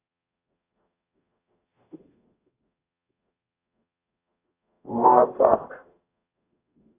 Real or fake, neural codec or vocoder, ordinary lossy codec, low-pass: fake; codec, 44.1 kHz, 0.9 kbps, DAC; AAC, 24 kbps; 3.6 kHz